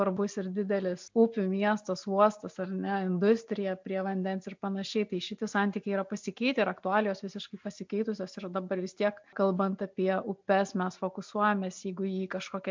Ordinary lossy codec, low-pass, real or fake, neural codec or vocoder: MP3, 64 kbps; 7.2 kHz; real; none